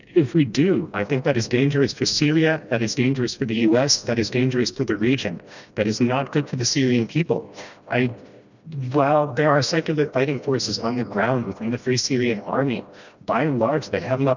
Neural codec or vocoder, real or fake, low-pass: codec, 16 kHz, 1 kbps, FreqCodec, smaller model; fake; 7.2 kHz